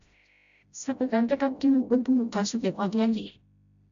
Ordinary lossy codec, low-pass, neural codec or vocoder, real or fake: MP3, 96 kbps; 7.2 kHz; codec, 16 kHz, 0.5 kbps, FreqCodec, smaller model; fake